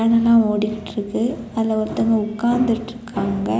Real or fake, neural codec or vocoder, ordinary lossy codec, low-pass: real; none; none; none